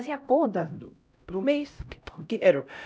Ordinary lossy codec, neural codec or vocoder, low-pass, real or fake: none; codec, 16 kHz, 0.5 kbps, X-Codec, HuBERT features, trained on LibriSpeech; none; fake